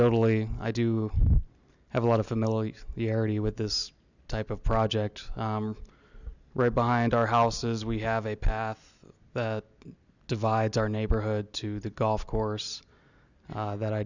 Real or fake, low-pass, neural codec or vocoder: real; 7.2 kHz; none